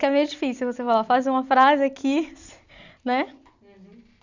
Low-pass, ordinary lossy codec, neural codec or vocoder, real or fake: 7.2 kHz; Opus, 64 kbps; none; real